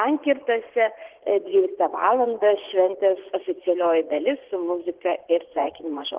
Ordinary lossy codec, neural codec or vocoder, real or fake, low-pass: Opus, 16 kbps; vocoder, 44.1 kHz, 80 mel bands, Vocos; fake; 3.6 kHz